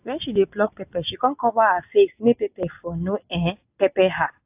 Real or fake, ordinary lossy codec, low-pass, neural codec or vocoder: real; AAC, 32 kbps; 3.6 kHz; none